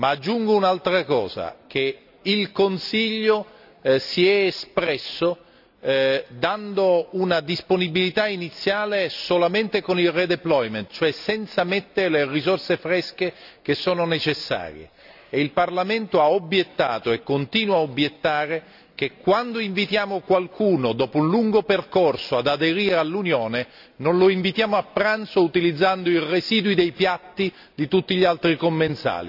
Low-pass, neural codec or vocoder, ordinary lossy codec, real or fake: 5.4 kHz; none; none; real